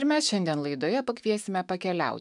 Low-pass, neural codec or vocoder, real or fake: 10.8 kHz; autoencoder, 48 kHz, 128 numbers a frame, DAC-VAE, trained on Japanese speech; fake